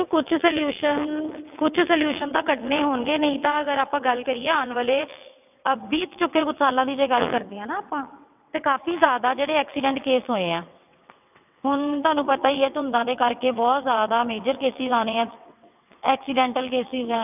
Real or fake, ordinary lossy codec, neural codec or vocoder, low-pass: fake; none; vocoder, 22.05 kHz, 80 mel bands, WaveNeXt; 3.6 kHz